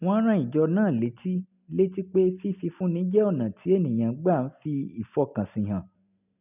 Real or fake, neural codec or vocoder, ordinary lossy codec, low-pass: real; none; none; 3.6 kHz